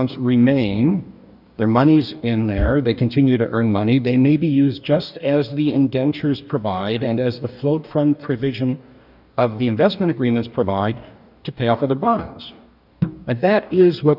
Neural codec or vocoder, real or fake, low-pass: codec, 44.1 kHz, 2.6 kbps, DAC; fake; 5.4 kHz